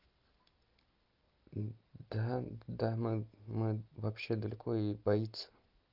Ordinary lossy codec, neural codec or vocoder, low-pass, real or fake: Opus, 24 kbps; none; 5.4 kHz; real